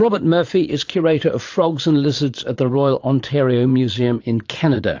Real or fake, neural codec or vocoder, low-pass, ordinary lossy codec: fake; vocoder, 22.05 kHz, 80 mel bands, Vocos; 7.2 kHz; AAC, 48 kbps